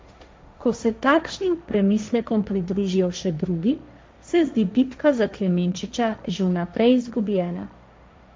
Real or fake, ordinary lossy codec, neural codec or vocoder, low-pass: fake; none; codec, 16 kHz, 1.1 kbps, Voila-Tokenizer; none